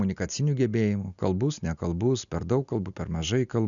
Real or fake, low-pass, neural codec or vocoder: real; 7.2 kHz; none